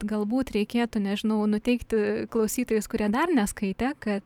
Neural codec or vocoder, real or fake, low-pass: vocoder, 44.1 kHz, 128 mel bands every 512 samples, BigVGAN v2; fake; 19.8 kHz